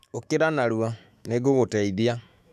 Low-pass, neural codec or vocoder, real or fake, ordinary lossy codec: 14.4 kHz; autoencoder, 48 kHz, 128 numbers a frame, DAC-VAE, trained on Japanese speech; fake; none